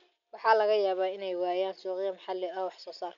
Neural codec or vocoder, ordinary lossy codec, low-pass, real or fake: none; MP3, 96 kbps; 7.2 kHz; real